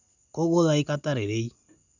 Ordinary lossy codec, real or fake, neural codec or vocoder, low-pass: none; real; none; 7.2 kHz